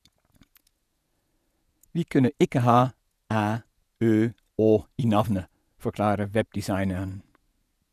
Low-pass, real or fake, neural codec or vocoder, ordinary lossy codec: 14.4 kHz; fake; vocoder, 48 kHz, 128 mel bands, Vocos; none